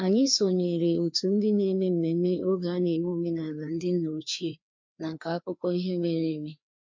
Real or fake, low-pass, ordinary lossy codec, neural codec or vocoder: fake; 7.2 kHz; MP3, 64 kbps; codec, 16 kHz, 2 kbps, FreqCodec, larger model